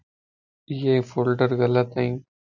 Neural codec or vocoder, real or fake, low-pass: none; real; 7.2 kHz